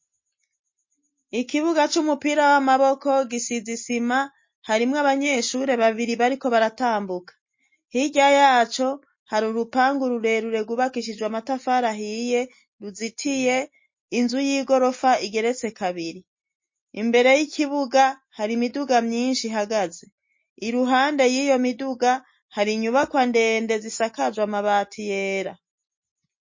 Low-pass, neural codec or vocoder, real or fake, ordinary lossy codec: 7.2 kHz; none; real; MP3, 32 kbps